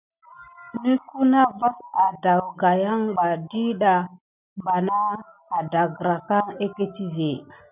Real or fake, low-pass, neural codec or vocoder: real; 3.6 kHz; none